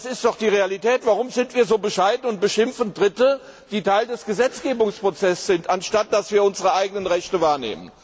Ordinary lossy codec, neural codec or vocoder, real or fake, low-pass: none; none; real; none